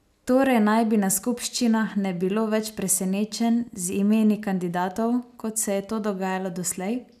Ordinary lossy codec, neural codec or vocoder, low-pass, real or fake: none; none; 14.4 kHz; real